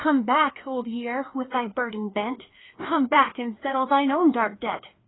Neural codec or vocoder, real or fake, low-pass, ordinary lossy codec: codec, 16 kHz, 2 kbps, FreqCodec, larger model; fake; 7.2 kHz; AAC, 16 kbps